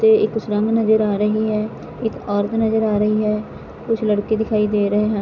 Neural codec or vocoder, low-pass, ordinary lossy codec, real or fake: none; 7.2 kHz; none; real